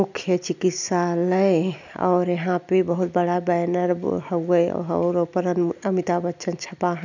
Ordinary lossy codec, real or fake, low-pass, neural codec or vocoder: none; real; 7.2 kHz; none